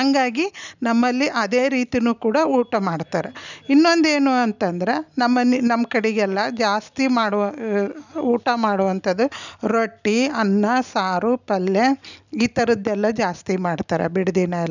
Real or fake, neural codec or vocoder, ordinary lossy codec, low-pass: real; none; none; 7.2 kHz